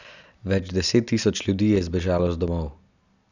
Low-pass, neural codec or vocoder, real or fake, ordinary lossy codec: 7.2 kHz; none; real; none